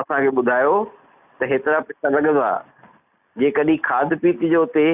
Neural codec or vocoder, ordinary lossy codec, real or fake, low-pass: none; none; real; 3.6 kHz